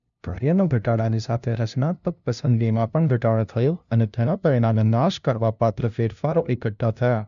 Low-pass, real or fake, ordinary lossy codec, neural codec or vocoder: 7.2 kHz; fake; none; codec, 16 kHz, 0.5 kbps, FunCodec, trained on LibriTTS, 25 frames a second